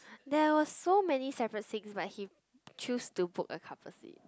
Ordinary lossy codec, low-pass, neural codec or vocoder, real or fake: none; none; none; real